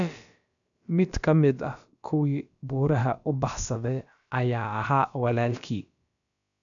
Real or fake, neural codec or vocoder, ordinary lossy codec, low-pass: fake; codec, 16 kHz, about 1 kbps, DyCAST, with the encoder's durations; none; 7.2 kHz